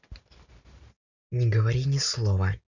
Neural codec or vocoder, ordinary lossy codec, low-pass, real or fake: none; none; 7.2 kHz; real